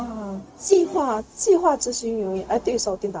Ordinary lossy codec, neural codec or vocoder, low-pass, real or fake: none; codec, 16 kHz, 0.4 kbps, LongCat-Audio-Codec; none; fake